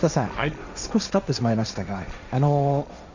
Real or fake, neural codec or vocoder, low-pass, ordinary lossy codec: fake; codec, 16 kHz, 1.1 kbps, Voila-Tokenizer; 7.2 kHz; none